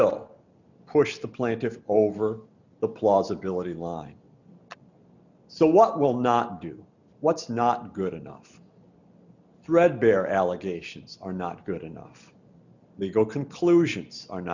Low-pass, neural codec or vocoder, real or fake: 7.2 kHz; none; real